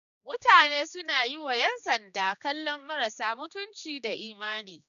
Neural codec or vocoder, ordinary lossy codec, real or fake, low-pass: codec, 16 kHz, 2 kbps, X-Codec, HuBERT features, trained on general audio; none; fake; 7.2 kHz